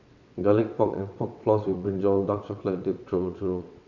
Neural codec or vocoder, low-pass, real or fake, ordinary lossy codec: vocoder, 22.05 kHz, 80 mel bands, WaveNeXt; 7.2 kHz; fake; none